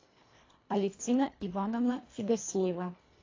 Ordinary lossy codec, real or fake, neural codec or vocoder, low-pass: AAC, 32 kbps; fake; codec, 24 kHz, 1.5 kbps, HILCodec; 7.2 kHz